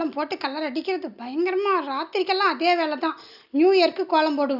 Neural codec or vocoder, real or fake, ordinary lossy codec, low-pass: none; real; none; 5.4 kHz